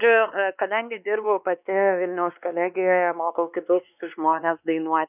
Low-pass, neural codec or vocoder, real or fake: 3.6 kHz; codec, 16 kHz, 2 kbps, X-Codec, WavLM features, trained on Multilingual LibriSpeech; fake